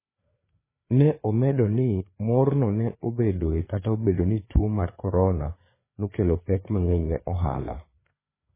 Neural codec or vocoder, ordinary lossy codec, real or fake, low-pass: codec, 16 kHz, 4 kbps, FreqCodec, larger model; MP3, 16 kbps; fake; 3.6 kHz